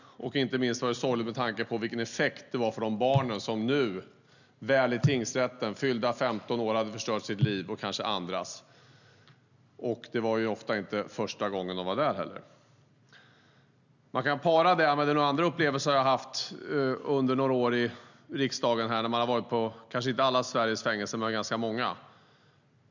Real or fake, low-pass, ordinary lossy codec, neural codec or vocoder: real; 7.2 kHz; none; none